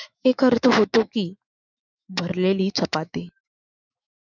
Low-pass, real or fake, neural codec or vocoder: 7.2 kHz; fake; autoencoder, 48 kHz, 128 numbers a frame, DAC-VAE, trained on Japanese speech